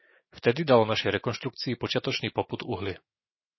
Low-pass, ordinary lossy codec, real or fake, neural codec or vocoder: 7.2 kHz; MP3, 24 kbps; fake; autoencoder, 48 kHz, 128 numbers a frame, DAC-VAE, trained on Japanese speech